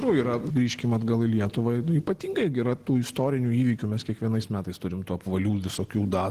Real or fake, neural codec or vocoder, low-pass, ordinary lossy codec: real; none; 14.4 kHz; Opus, 16 kbps